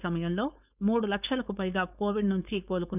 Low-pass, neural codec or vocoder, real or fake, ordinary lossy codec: 3.6 kHz; codec, 16 kHz, 4.8 kbps, FACodec; fake; none